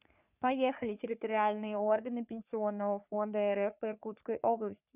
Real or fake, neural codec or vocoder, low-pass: fake; codec, 44.1 kHz, 3.4 kbps, Pupu-Codec; 3.6 kHz